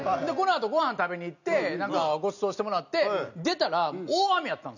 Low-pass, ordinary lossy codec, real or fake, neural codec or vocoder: 7.2 kHz; none; real; none